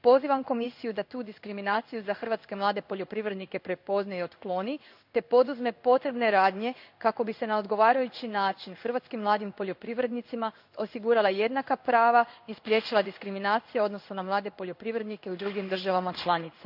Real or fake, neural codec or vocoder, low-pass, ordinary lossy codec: fake; codec, 16 kHz in and 24 kHz out, 1 kbps, XY-Tokenizer; 5.4 kHz; none